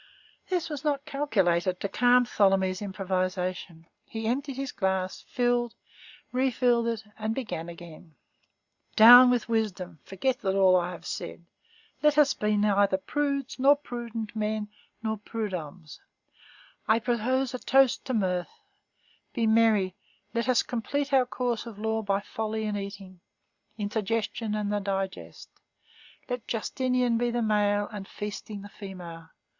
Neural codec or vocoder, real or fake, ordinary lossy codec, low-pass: none; real; Opus, 64 kbps; 7.2 kHz